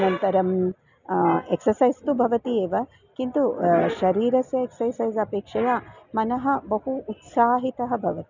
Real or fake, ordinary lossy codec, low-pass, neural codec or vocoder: real; none; 7.2 kHz; none